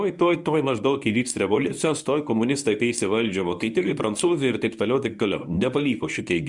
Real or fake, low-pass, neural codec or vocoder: fake; 10.8 kHz; codec, 24 kHz, 0.9 kbps, WavTokenizer, medium speech release version 1